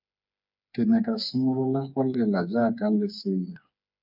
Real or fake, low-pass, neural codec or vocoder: fake; 5.4 kHz; codec, 16 kHz, 4 kbps, FreqCodec, smaller model